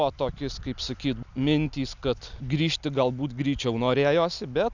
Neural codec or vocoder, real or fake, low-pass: none; real; 7.2 kHz